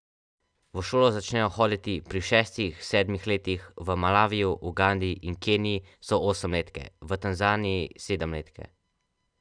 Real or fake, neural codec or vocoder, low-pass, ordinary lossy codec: real; none; 9.9 kHz; none